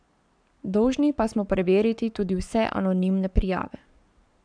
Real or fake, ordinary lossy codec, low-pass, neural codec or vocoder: fake; none; 9.9 kHz; codec, 44.1 kHz, 7.8 kbps, Pupu-Codec